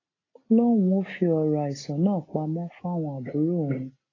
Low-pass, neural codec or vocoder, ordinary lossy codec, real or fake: 7.2 kHz; none; AAC, 32 kbps; real